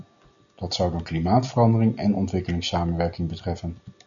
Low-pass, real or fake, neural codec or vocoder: 7.2 kHz; real; none